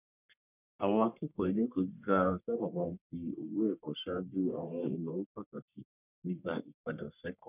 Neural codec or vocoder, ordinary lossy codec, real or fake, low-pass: codec, 44.1 kHz, 1.7 kbps, Pupu-Codec; none; fake; 3.6 kHz